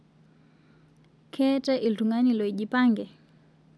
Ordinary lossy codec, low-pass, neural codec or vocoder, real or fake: none; none; none; real